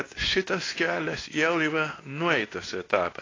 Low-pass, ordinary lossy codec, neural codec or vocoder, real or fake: 7.2 kHz; AAC, 32 kbps; codec, 24 kHz, 0.9 kbps, WavTokenizer, medium speech release version 2; fake